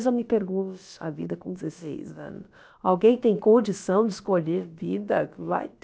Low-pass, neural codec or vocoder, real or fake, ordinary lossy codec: none; codec, 16 kHz, about 1 kbps, DyCAST, with the encoder's durations; fake; none